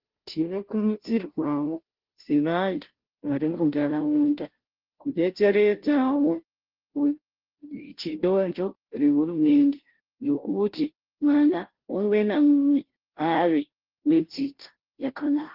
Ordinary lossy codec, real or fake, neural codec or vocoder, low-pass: Opus, 16 kbps; fake; codec, 16 kHz, 0.5 kbps, FunCodec, trained on Chinese and English, 25 frames a second; 5.4 kHz